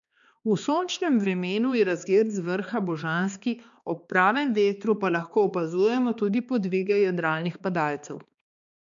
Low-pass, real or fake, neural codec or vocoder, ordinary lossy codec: 7.2 kHz; fake; codec, 16 kHz, 2 kbps, X-Codec, HuBERT features, trained on balanced general audio; none